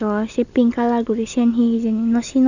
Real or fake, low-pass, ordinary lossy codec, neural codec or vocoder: real; 7.2 kHz; none; none